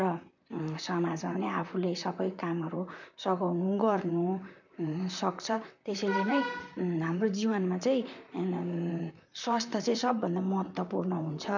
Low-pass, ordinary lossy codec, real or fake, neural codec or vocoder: 7.2 kHz; none; fake; vocoder, 44.1 kHz, 128 mel bands, Pupu-Vocoder